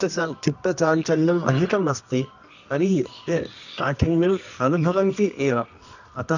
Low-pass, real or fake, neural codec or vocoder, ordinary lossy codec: 7.2 kHz; fake; codec, 24 kHz, 0.9 kbps, WavTokenizer, medium music audio release; none